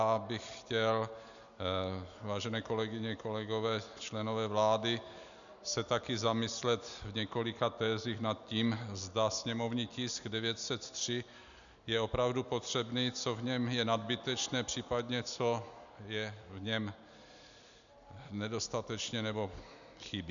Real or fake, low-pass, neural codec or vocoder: real; 7.2 kHz; none